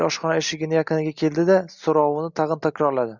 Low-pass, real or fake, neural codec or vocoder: 7.2 kHz; real; none